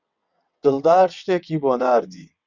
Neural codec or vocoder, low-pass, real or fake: vocoder, 22.05 kHz, 80 mel bands, WaveNeXt; 7.2 kHz; fake